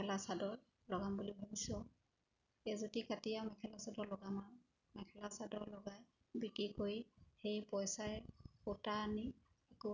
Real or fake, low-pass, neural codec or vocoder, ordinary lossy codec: real; 7.2 kHz; none; none